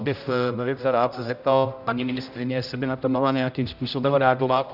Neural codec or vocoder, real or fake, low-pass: codec, 16 kHz, 0.5 kbps, X-Codec, HuBERT features, trained on general audio; fake; 5.4 kHz